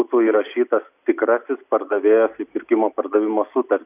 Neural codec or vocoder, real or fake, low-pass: none; real; 3.6 kHz